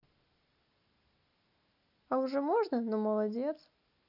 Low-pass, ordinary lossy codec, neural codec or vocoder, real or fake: 5.4 kHz; none; none; real